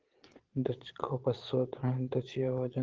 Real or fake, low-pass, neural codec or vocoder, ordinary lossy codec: fake; 7.2 kHz; vocoder, 22.05 kHz, 80 mel bands, Vocos; Opus, 16 kbps